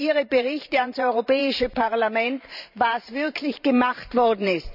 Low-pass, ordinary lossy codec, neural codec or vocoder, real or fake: 5.4 kHz; none; vocoder, 44.1 kHz, 128 mel bands every 512 samples, BigVGAN v2; fake